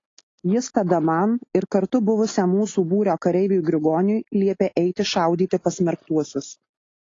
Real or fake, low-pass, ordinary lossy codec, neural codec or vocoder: real; 7.2 kHz; AAC, 32 kbps; none